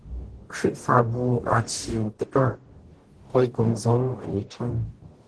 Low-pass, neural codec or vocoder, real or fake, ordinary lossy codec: 10.8 kHz; codec, 44.1 kHz, 0.9 kbps, DAC; fake; Opus, 16 kbps